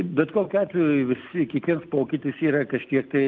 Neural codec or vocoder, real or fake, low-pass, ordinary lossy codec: none; real; 7.2 kHz; Opus, 32 kbps